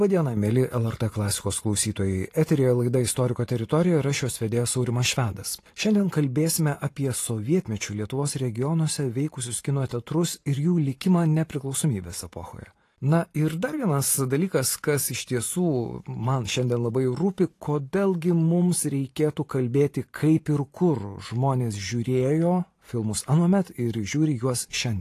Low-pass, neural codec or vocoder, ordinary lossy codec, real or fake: 14.4 kHz; vocoder, 44.1 kHz, 128 mel bands every 512 samples, BigVGAN v2; AAC, 48 kbps; fake